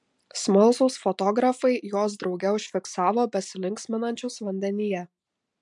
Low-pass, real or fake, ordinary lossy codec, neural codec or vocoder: 10.8 kHz; real; MP3, 64 kbps; none